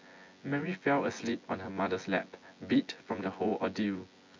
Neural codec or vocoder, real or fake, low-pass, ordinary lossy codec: vocoder, 24 kHz, 100 mel bands, Vocos; fake; 7.2 kHz; MP3, 48 kbps